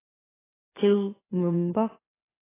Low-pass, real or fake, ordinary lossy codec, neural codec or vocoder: 3.6 kHz; fake; AAC, 16 kbps; autoencoder, 44.1 kHz, a latent of 192 numbers a frame, MeloTTS